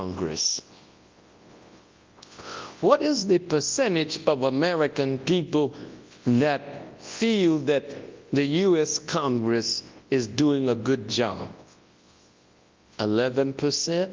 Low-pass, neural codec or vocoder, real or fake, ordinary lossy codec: 7.2 kHz; codec, 24 kHz, 0.9 kbps, WavTokenizer, large speech release; fake; Opus, 32 kbps